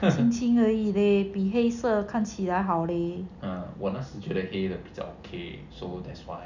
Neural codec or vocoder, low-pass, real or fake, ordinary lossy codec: none; 7.2 kHz; real; none